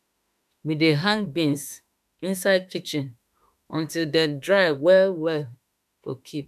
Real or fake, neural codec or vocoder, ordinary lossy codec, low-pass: fake; autoencoder, 48 kHz, 32 numbers a frame, DAC-VAE, trained on Japanese speech; none; 14.4 kHz